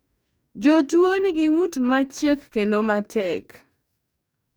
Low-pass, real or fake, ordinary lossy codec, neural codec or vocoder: none; fake; none; codec, 44.1 kHz, 2.6 kbps, DAC